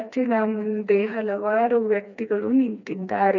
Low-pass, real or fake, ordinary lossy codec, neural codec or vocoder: 7.2 kHz; fake; none; codec, 16 kHz, 2 kbps, FreqCodec, smaller model